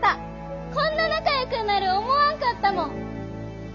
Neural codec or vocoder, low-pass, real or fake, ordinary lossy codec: none; 7.2 kHz; real; none